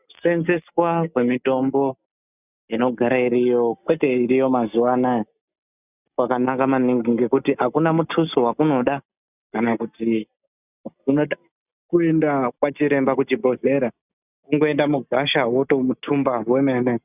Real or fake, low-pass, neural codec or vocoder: real; 3.6 kHz; none